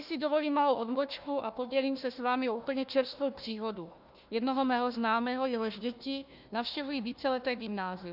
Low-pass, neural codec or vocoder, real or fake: 5.4 kHz; codec, 16 kHz, 1 kbps, FunCodec, trained on Chinese and English, 50 frames a second; fake